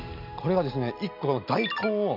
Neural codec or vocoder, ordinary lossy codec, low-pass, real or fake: none; none; 5.4 kHz; real